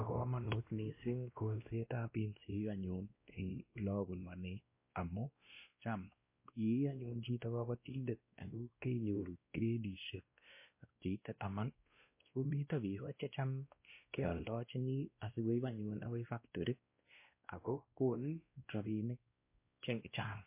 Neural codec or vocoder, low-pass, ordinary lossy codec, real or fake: codec, 16 kHz, 1 kbps, X-Codec, WavLM features, trained on Multilingual LibriSpeech; 3.6 kHz; MP3, 24 kbps; fake